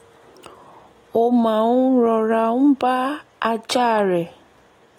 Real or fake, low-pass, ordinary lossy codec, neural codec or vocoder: real; 19.8 kHz; AAC, 48 kbps; none